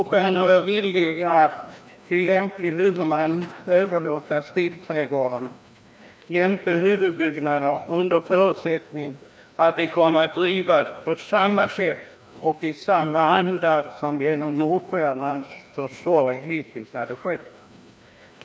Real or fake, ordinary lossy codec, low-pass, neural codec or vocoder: fake; none; none; codec, 16 kHz, 1 kbps, FreqCodec, larger model